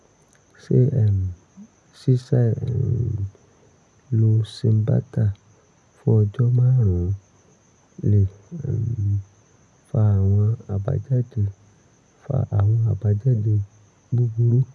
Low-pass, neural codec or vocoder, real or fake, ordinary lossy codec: none; none; real; none